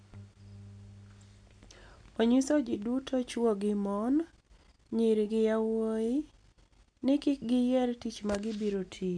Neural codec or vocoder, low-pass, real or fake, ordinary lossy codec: none; 9.9 kHz; real; none